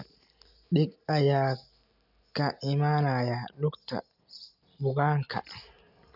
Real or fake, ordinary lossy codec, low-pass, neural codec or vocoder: real; none; 5.4 kHz; none